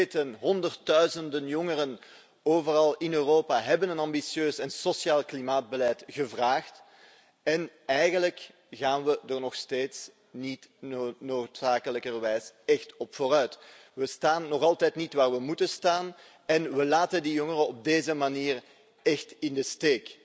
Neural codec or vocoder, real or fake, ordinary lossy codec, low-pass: none; real; none; none